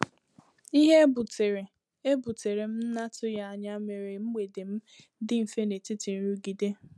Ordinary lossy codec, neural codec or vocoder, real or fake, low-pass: none; none; real; none